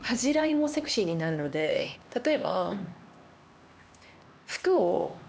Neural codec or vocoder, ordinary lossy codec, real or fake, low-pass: codec, 16 kHz, 2 kbps, X-Codec, HuBERT features, trained on LibriSpeech; none; fake; none